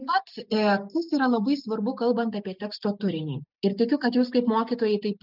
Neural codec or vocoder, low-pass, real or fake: none; 5.4 kHz; real